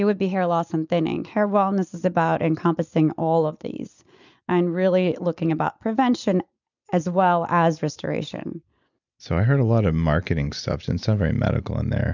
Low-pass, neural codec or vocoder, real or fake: 7.2 kHz; none; real